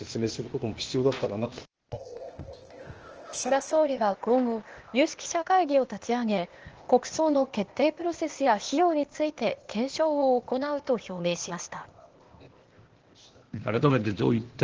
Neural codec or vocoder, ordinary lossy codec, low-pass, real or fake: codec, 16 kHz, 0.8 kbps, ZipCodec; Opus, 16 kbps; 7.2 kHz; fake